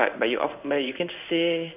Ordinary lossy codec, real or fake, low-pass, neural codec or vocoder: none; fake; 3.6 kHz; codec, 16 kHz in and 24 kHz out, 1 kbps, XY-Tokenizer